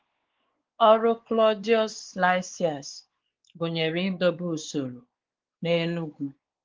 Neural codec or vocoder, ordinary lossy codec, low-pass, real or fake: codec, 16 kHz, 4 kbps, X-Codec, WavLM features, trained on Multilingual LibriSpeech; Opus, 16 kbps; 7.2 kHz; fake